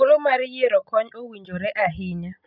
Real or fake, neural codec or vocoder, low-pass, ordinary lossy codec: real; none; 5.4 kHz; none